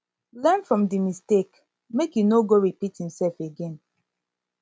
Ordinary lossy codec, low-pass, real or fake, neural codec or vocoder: none; none; real; none